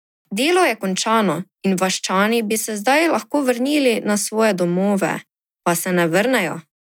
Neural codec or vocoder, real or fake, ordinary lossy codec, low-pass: none; real; none; 19.8 kHz